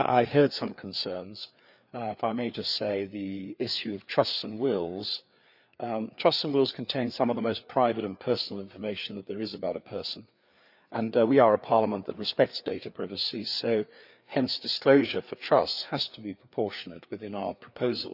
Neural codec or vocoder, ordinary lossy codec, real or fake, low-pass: codec, 16 kHz, 4 kbps, FreqCodec, larger model; none; fake; 5.4 kHz